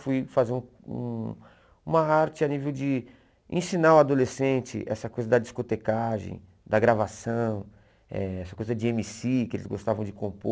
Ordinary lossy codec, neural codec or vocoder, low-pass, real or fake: none; none; none; real